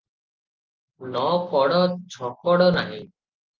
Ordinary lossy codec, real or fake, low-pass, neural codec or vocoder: Opus, 24 kbps; real; 7.2 kHz; none